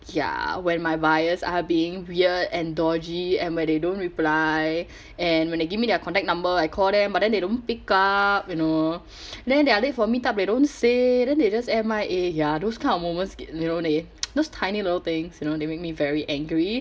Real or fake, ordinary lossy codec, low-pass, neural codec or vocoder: real; none; none; none